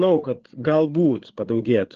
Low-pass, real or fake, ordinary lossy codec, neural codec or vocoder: 7.2 kHz; fake; Opus, 16 kbps; codec, 16 kHz, 4 kbps, FreqCodec, larger model